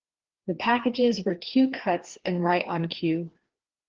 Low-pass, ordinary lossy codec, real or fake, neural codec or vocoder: 7.2 kHz; Opus, 16 kbps; fake; codec, 16 kHz, 2 kbps, FreqCodec, larger model